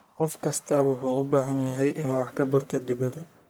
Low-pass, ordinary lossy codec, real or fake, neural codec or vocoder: none; none; fake; codec, 44.1 kHz, 1.7 kbps, Pupu-Codec